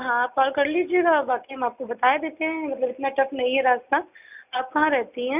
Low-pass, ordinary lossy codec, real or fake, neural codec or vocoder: 3.6 kHz; none; real; none